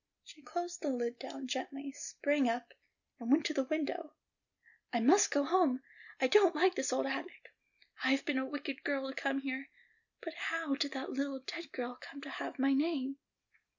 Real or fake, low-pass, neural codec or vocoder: real; 7.2 kHz; none